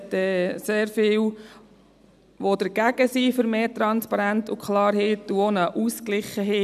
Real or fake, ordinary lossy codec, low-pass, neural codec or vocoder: real; none; 14.4 kHz; none